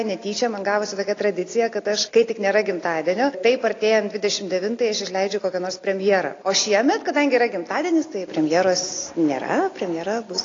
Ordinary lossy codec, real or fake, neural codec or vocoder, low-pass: AAC, 32 kbps; real; none; 7.2 kHz